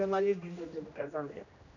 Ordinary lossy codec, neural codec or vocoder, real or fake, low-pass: none; codec, 16 kHz, 1 kbps, X-Codec, HuBERT features, trained on general audio; fake; 7.2 kHz